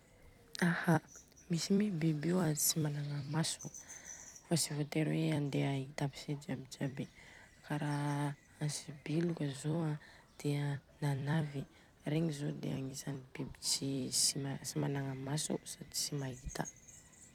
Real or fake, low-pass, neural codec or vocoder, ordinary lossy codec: fake; 19.8 kHz; vocoder, 44.1 kHz, 128 mel bands every 256 samples, BigVGAN v2; none